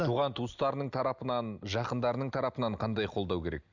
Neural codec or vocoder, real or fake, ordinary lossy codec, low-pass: none; real; none; 7.2 kHz